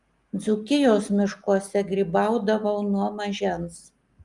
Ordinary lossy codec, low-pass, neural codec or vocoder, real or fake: Opus, 32 kbps; 10.8 kHz; none; real